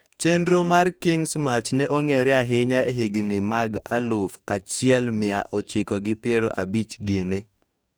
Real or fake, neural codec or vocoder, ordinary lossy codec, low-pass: fake; codec, 44.1 kHz, 2.6 kbps, DAC; none; none